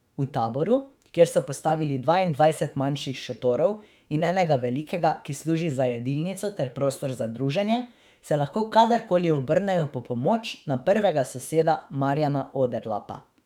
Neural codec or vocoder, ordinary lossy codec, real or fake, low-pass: autoencoder, 48 kHz, 32 numbers a frame, DAC-VAE, trained on Japanese speech; none; fake; 19.8 kHz